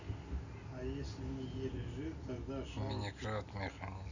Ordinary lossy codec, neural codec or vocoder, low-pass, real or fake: none; none; 7.2 kHz; real